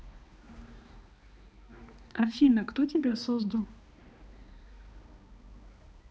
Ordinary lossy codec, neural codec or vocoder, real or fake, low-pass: none; codec, 16 kHz, 2 kbps, X-Codec, HuBERT features, trained on balanced general audio; fake; none